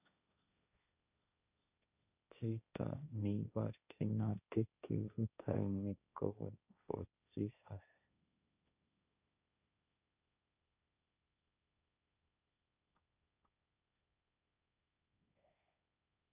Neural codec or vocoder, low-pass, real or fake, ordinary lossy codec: codec, 16 kHz, 1.1 kbps, Voila-Tokenizer; 3.6 kHz; fake; none